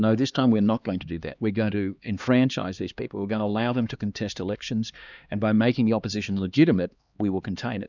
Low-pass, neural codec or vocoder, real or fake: 7.2 kHz; codec, 16 kHz, 2 kbps, X-Codec, HuBERT features, trained on LibriSpeech; fake